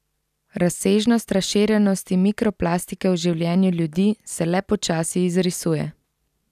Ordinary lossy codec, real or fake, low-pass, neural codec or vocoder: none; real; 14.4 kHz; none